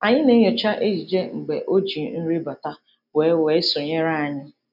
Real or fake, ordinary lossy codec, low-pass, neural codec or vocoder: real; none; 5.4 kHz; none